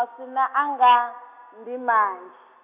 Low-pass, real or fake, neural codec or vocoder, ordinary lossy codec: 3.6 kHz; real; none; none